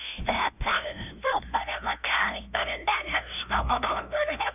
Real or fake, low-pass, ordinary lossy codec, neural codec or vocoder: fake; 3.6 kHz; none; codec, 16 kHz, 0.5 kbps, FunCodec, trained on LibriTTS, 25 frames a second